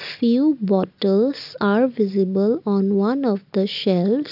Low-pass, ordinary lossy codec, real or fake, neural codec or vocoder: 5.4 kHz; AAC, 48 kbps; real; none